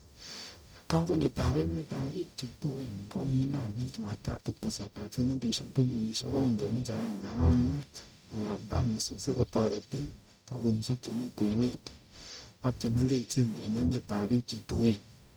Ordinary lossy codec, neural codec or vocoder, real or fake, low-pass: none; codec, 44.1 kHz, 0.9 kbps, DAC; fake; none